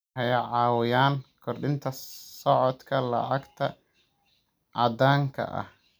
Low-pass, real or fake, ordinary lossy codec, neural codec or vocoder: none; real; none; none